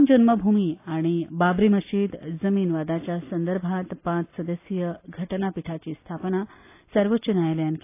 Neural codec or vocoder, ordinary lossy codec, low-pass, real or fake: none; AAC, 24 kbps; 3.6 kHz; real